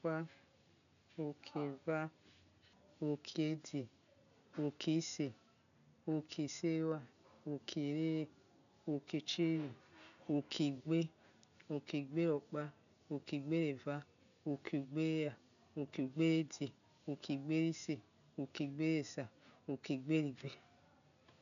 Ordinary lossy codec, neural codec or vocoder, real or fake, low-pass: none; none; real; 7.2 kHz